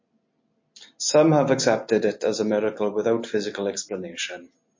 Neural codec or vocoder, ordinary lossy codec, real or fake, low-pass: none; MP3, 32 kbps; real; 7.2 kHz